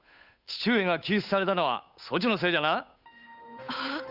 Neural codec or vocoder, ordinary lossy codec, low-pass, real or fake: codec, 16 kHz, 8 kbps, FunCodec, trained on Chinese and English, 25 frames a second; none; 5.4 kHz; fake